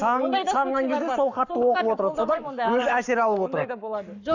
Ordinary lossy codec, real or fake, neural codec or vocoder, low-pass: none; fake; codec, 44.1 kHz, 7.8 kbps, Pupu-Codec; 7.2 kHz